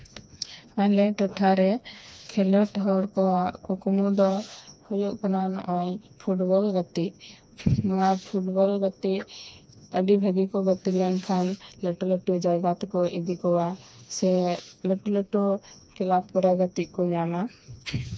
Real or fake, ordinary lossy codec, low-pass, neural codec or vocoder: fake; none; none; codec, 16 kHz, 2 kbps, FreqCodec, smaller model